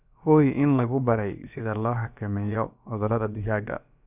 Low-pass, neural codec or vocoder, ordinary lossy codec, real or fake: 3.6 kHz; codec, 16 kHz, about 1 kbps, DyCAST, with the encoder's durations; none; fake